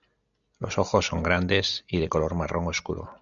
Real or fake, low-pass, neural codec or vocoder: real; 7.2 kHz; none